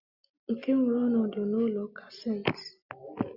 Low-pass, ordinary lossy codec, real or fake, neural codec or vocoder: 5.4 kHz; Opus, 64 kbps; real; none